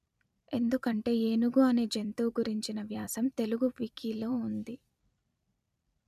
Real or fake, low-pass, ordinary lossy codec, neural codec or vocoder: fake; 14.4 kHz; MP3, 96 kbps; vocoder, 44.1 kHz, 128 mel bands every 256 samples, BigVGAN v2